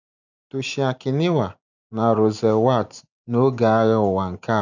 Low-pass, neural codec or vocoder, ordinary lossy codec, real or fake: 7.2 kHz; none; none; real